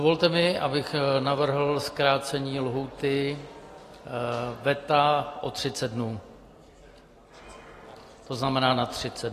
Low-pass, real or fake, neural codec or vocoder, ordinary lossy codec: 14.4 kHz; real; none; AAC, 48 kbps